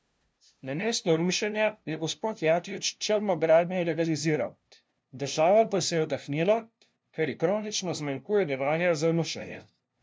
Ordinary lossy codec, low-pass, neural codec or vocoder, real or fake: none; none; codec, 16 kHz, 0.5 kbps, FunCodec, trained on LibriTTS, 25 frames a second; fake